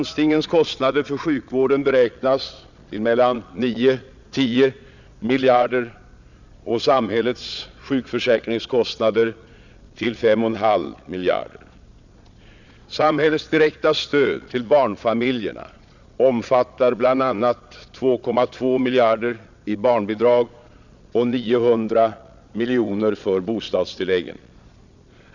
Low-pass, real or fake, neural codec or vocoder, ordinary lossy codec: 7.2 kHz; fake; vocoder, 22.05 kHz, 80 mel bands, Vocos; none